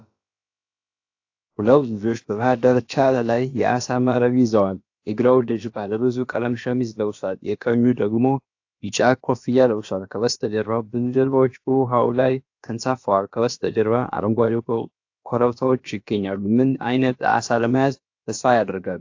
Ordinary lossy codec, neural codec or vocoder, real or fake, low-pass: AAC, 48 kbps; codec, 16 kHz, about 1 kbps, DyCAST, with the encoder's durations; fake; 7.2 kHz